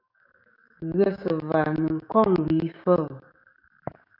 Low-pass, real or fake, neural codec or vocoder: 5.4 kHz; fake; codec, 16 kHz, 6 kbps, DAC